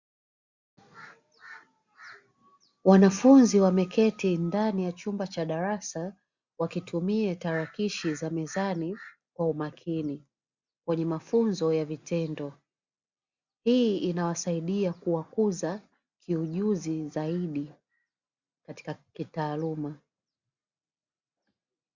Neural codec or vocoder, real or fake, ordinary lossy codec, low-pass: none; real; Opus, 64 kbps; 7.2 kHz